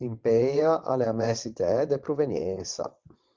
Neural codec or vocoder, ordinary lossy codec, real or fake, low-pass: vocoder, 22.05 kHz, 80 mel bands, WaveNeXt; Opus, 32 kbps; fake; 7.2 kHz